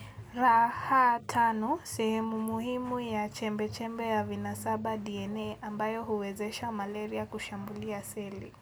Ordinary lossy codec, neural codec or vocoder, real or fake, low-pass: none; none; real; none